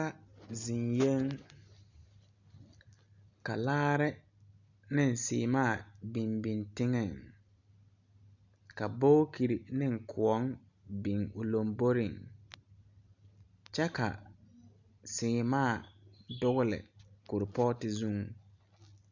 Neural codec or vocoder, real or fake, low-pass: none; real; 7.2 kHz